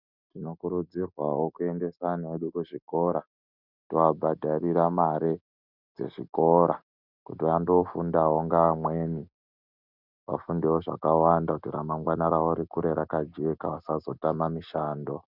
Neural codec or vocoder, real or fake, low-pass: none; real; 5.4 kHz